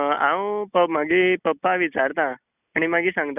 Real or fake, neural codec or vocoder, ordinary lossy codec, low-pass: real; none; none; 3.6 kHz